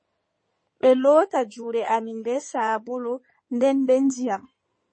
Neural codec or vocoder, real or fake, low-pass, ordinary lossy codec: codec, 16 kHz in and 24 kHz out, 2.2 kbps, FireRedTTS-2 codec; fake; 9.9 kHz; MP3, 32 kbps